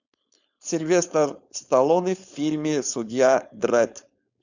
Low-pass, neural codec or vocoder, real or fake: 7.2 kHz; codec, 16 kHz, 4.8 kbps, FACodec; fake